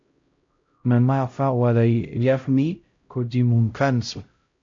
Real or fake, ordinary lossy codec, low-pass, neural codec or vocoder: fake; MP3, 48 kbps; 7.2 kHz; codec, 16 kHz, 0.5 kbps, X-Codec, HuBERT features, trained on LibriSpeech